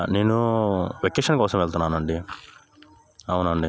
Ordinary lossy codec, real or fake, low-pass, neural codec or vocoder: none; real; none; none